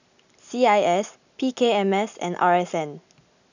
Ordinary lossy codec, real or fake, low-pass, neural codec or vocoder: none; real; 7.2 kHz; none